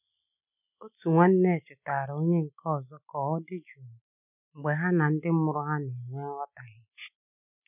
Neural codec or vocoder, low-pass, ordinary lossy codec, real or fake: none; 3.6 kHz; none; real